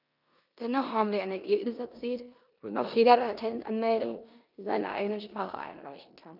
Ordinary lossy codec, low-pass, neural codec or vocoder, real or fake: none; 5.4 kHz; codec, 16 kHz in and 24 kHz out, 0.9 kbps, LongCat-Audio-Codec, four codebook decoder; fake